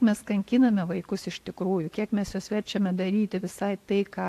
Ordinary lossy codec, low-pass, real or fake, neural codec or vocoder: AAC, 64 kbps; 14.4 kHz; real; none